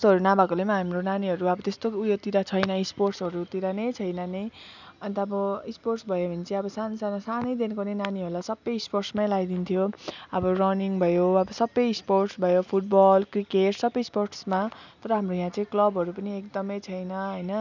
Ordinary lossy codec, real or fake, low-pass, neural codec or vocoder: none; real; 7.2 kHz; none